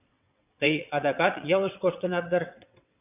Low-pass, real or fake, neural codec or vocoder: 3.6 kHz; fake; vocoder, 22.05 kHz, 80 mel bands, WaveNeXt